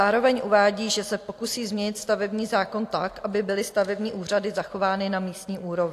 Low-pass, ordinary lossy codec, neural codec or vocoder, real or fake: 14.4 kHz; AAC, 64 kbps; none; real